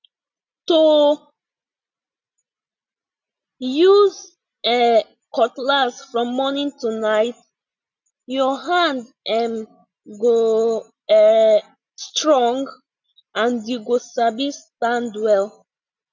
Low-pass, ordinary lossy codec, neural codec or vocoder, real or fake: 7.2 kHz; none; none; real